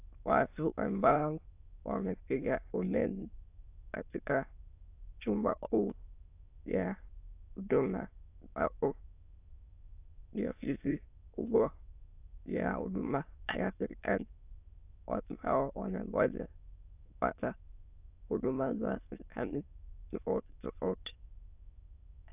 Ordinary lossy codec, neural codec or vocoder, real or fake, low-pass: AAC, 32 kbps; autoencoder, 22.05 kHz, a latent of 192 numbers a frame, VITS, trained on many speakers; fake; 3.6 kHz